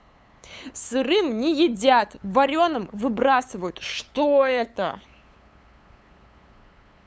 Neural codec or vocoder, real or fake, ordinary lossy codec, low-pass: codec, 16 kHz, 8 kbps, FunCodec, trained on LibriTTS, 25 frames a second; fake; none; none